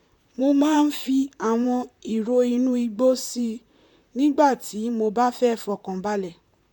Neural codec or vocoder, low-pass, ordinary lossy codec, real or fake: vocoder, 44.1 kHz, 128 mel bands, Pupu-Vocoder; 19.8 kHz; none; fake